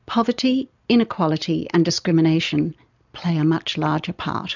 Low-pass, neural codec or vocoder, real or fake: 7.2 kHz; none; real